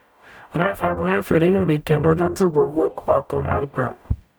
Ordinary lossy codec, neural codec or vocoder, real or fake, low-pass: none; codec, 44.1 kHz, 0.9 kbps, DAC; fake; none